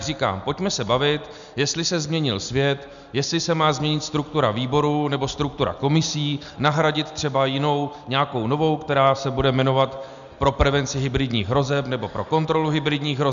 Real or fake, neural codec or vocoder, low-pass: real; none; 7.2 kHz